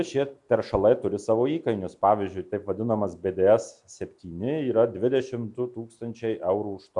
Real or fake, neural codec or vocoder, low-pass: real; none; 10.8 kHz